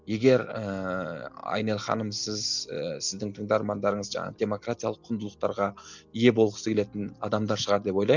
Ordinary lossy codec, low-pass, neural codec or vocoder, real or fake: none; 7.2 kHz; none; real